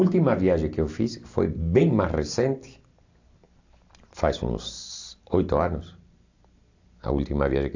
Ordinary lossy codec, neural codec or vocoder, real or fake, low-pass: AAC, 48 kbps; none; real; 7.2 kHz